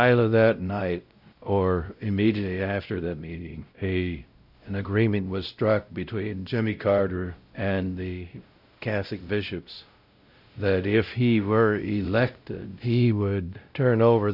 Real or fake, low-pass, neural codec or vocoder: fake; 5.4 kHz; codec, 16 kHz, 0.5 kbps, X-Codec, WavLM features, trained on Multilingual LibriSpeech